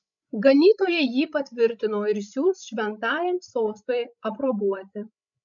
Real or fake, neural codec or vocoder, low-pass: fake; codec, 16 kHz, 16 kbps, FreqCodec, larger model; 7.2 kHz